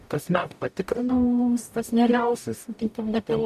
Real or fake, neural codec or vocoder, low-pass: fake; codec, 44.1 kHz, 0.9 kbps, DAC; 14.4 kHz